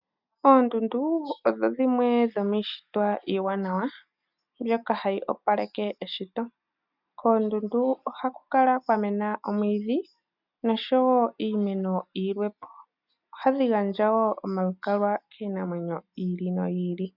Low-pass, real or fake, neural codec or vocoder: 5.4 kHz; real; none